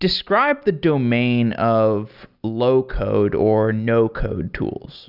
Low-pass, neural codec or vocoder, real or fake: 5.4 kHz; none; real